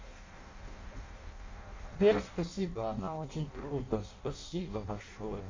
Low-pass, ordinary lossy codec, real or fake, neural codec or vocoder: 7.2 kHz; MP3, 48 kbps; fake; codec, 16 kHz in and 24 kHz out, 0.6 kbps, FireRedTTS-2 codec